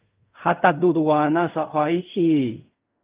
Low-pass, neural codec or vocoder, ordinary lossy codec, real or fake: 3.6 kHz; codec, 16 kHz in and 24 kHz out, 0.4 kbps, LongCat-Audio-Codec, fine tuned four codebook decoder; Opus, 24 kbps; fake